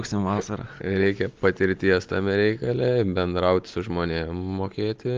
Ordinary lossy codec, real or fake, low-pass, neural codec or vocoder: Opus, 32 kbps; real; 7.2 kHz; none